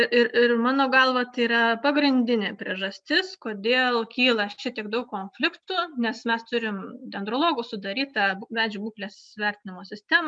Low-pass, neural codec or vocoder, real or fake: 9.9 kHz; none; real